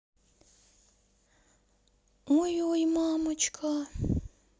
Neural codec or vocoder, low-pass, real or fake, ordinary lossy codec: none; none; real; none